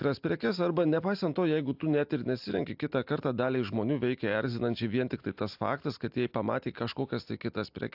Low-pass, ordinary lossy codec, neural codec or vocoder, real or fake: 5.4 kHz; AAC, 48 kbps; none; real